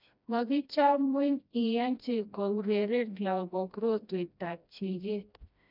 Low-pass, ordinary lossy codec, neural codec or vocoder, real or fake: 5.4 kHz; none; codec, 16 kHz, 1 kbps, FreqCodec, smaller model; fake